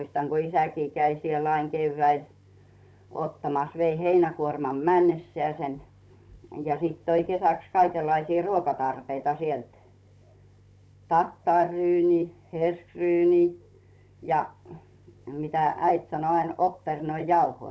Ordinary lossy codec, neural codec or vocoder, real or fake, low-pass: none; codec, 16 kHz, 16 kbps, FunCodec, trained on Chinese and English, 50 frames a second; fake; none